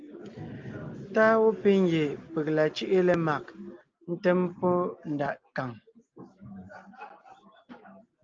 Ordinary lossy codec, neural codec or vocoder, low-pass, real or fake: Opus, 24 kbps; none; 7.2 kHz; real